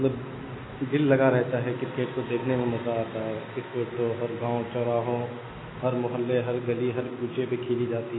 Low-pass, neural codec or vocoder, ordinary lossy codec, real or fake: 7.2 kHz; none; AAC, 16 kbps; real